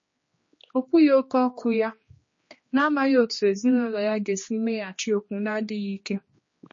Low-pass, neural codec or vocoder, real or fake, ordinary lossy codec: 7.2 kHz; codec, 16 kHz, 2 kbps, X-Codec, HuBERT features, trained on general audio; fake; MP3, 32 kbps